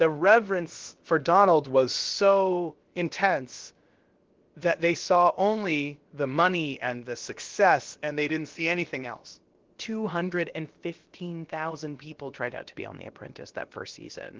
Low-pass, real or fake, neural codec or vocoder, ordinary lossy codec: 7.2 kHz; fake; codec, 16 kHz, about 1 kbps, DyCAST, with the encoder's durations; Opus, 16 kbps